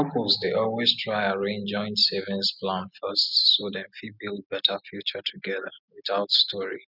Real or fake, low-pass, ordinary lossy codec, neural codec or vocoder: real; 5.4 kHz; none; none